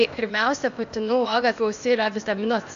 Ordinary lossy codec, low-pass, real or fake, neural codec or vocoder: AAC, 64 kbps; 7.2 kHz; fake; codec, 16 kHz, 0.8 kbps, ZipCodec